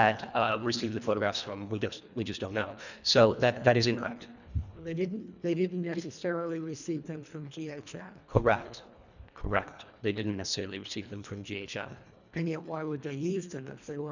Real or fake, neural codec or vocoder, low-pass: fake; codec, 24 kHz, 1.5 kbps, HILCodec; 7.2 kHz